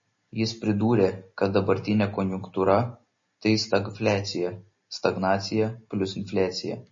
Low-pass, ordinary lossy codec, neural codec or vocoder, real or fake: 7.2 kHz; MP3, 32 kbps; none; real